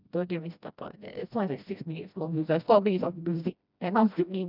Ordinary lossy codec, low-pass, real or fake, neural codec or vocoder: none; 5.4 kHz; fake; codec, 16 kHz, 1 kbps, FreqCodec, smaller model